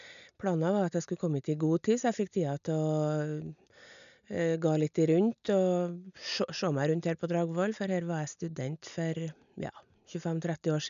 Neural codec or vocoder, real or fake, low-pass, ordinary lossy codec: none; real; 7.2 kHz; none